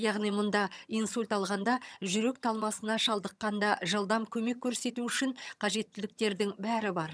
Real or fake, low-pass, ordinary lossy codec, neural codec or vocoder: fake; none; none; vocoder, 22.05 kHz, 80 mel bands, HiFi-GAN